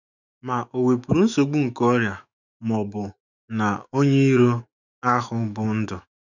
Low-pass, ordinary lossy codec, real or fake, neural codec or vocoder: 7.2 kHz; none; fake; autoencoder, 48 kHz, 128 numbers a frame, DAC-VAE, trained on Japanese speech